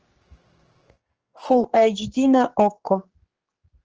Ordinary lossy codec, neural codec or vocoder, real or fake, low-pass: Opus, 16 kbps; codec, 16 kHz, 4 kbps, X-Codec, HuBERT features, trained on general audio; fake; 7.2 kHz